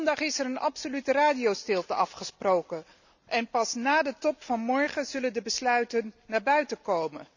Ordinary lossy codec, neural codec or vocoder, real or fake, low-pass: none; none; real; 7.2 kHz